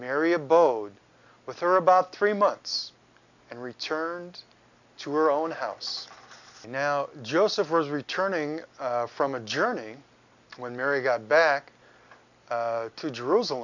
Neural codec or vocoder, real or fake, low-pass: none; real; 7.2 kHz